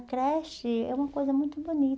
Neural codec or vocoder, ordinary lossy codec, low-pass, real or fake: none; none; none; real